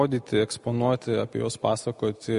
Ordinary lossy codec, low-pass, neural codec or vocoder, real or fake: MP3, 48 kbps; 14.4 kHz; none; real